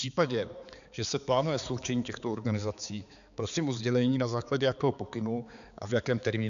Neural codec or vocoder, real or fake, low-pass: codec, 16 kHz, 4 kbps, X-Codec, HuBERT features, trained on balanced general audio; fake; 7.2 kHz